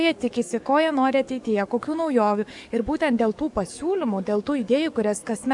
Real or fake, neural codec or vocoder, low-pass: fake; codec, 44.1 kHz, 7.8 kbps, DAC; 10.8 kHz